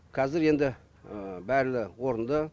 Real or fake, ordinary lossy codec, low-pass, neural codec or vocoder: real; none; none; none